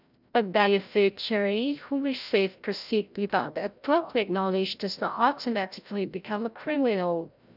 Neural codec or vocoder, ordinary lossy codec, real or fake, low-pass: codec, 16 kHz, 0.5 kbps, FreqCodec, larger model; none; fake; 5.4 kHz